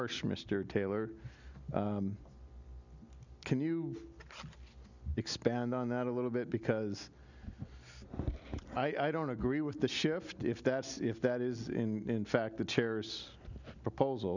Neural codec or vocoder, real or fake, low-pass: none; real; 7.2 kHz